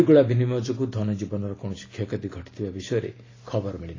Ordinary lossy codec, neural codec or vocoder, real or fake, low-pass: AAC, 32 kbps; none; real; 7.2 kHz